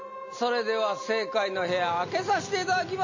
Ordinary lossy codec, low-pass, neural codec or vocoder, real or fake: MP3, 32 kbps; 7.2 kHz; none; real